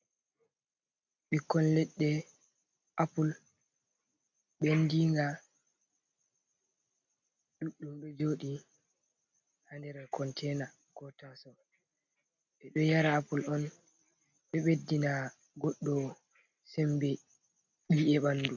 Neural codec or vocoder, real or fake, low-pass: none; real; 7.2 kHz